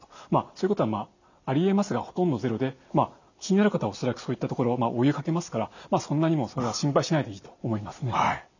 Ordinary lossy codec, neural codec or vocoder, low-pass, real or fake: none; none; 7.2 kHz; real